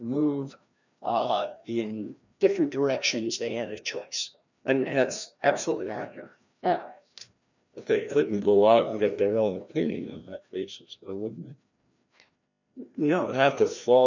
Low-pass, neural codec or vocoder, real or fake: 7.2 kHz; codec, 16 kHz, 1 kbps, FreqCodec, larger model; fake